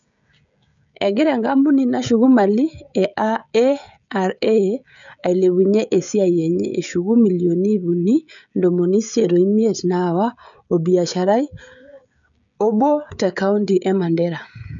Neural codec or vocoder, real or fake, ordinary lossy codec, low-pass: codec, 16 kHz, 16 kbps, FreqCodec, smaller model; fake; none; 7.2 kHz